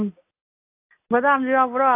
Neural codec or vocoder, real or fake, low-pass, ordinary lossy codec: none; real; 3.6 kHz; AAC, 24 kbps